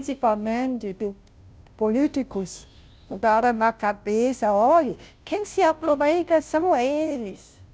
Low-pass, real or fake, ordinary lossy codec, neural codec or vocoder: none; fake; none; codec, 16 kHz, 0.5 kbps, FunCodec, trained on Chinese and English, 25 frames a second